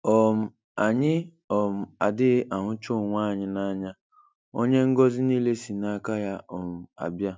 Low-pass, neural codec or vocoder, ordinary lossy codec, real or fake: none; none; none; real